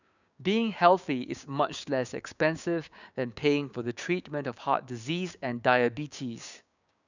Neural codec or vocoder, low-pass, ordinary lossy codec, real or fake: codec, 16 kHz, 6 kbps, DAC; 7.2 kHz; none; fake